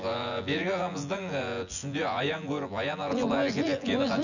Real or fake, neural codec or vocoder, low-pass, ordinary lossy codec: fake; vocoder, 24 kHz, 100 mel bands, Vocos; 7.2 kHz; none